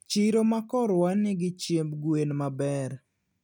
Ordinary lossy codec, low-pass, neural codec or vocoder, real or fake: none; 19.8 kHz; none; real